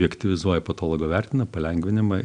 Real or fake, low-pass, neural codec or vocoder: real; 9.9 kHz; none